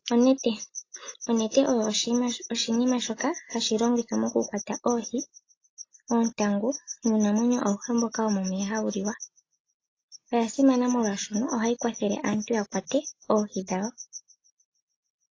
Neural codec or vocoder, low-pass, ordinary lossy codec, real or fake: none; 7.2 kHz; AAC, 32 kbps; real